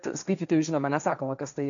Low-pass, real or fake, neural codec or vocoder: 7.2 kHz; fake; codec, 16 kHz, 1.1 kbps, Voila-Tokenizer